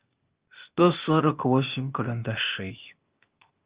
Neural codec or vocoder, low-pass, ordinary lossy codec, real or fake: codec, 16 kHz, 0.8 kbps, ZipCodec; 3.6 kHz; Opus, 24 kbps; fake